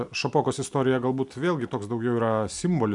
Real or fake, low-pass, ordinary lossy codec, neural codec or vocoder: real; 10.8 kHz; MP3, 96 kbps; none